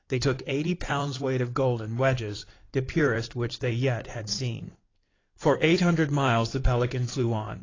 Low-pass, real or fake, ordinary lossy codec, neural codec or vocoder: 7.2 kHz; fake; AAC, 32 kbps; codec, 16 kHz in and 24 kHz out, 2.2 kbps, FireRedTTS-2 codec